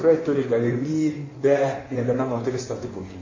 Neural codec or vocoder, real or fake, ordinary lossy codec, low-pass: codec, 16 kHz in and 24 kHz out, 1.1 kbps, FireRedTTS-2 codec; fake; MP3, 32 kbps; 7.2 kHz